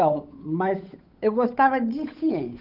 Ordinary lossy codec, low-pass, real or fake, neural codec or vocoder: none; 5.4 kHz; fake; codec, 16 kHz, 8 kbps, FunCodec, trained on Chinese and English, 25 frames a second